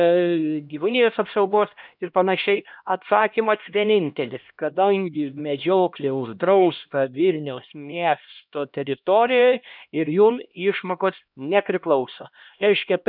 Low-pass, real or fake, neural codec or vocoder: 5.4 kHz; fake; codec, 16 kHz, 1 kbps, X-Codec, HuBERT features, trained on LibriSpeech